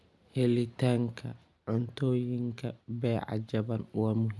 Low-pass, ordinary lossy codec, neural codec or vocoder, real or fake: none; none; none; real